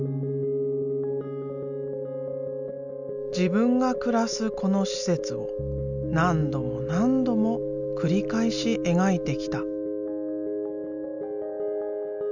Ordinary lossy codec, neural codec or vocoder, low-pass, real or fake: none; none; 7.2 kHz; real